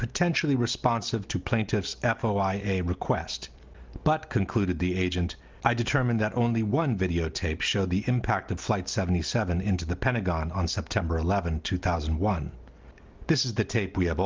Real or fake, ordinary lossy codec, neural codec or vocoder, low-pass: real; Opus, 32 kbps; none; 7.2 kHz